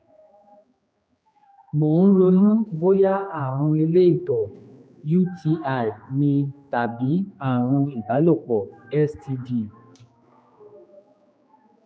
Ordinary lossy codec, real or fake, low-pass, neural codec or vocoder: none; fake; none; codec, 16 kHz, 2 kbps, X-Codec, HuBERT features, trained on general audio